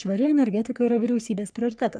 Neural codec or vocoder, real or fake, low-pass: codec, 44.1 kHz, 3.4 kbps, Pupu-Codec; fake; 9.9 kHz